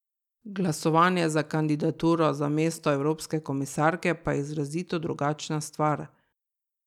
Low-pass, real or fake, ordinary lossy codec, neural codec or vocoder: 19.8 kHz; real; none; none